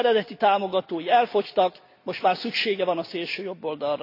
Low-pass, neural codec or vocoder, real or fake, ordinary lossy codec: 5.4 kHz; none; real; AAC, 32 kbps